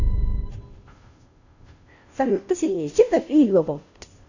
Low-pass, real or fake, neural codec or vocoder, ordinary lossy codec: 7.2 kHz; fake; codec, 16 kHz, 0.5 kbps, FunCodec, trained on Chinese and English, 25 frames a second; none